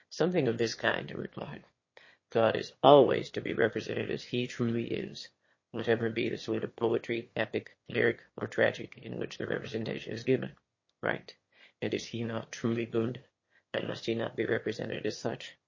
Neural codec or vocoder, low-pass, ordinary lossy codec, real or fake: autoencoder, 22.05 kHz, a latent of 192 numbers a frame, VITS, trained on one speaker; 7.2 kHz; MP3, 32 kbps; fake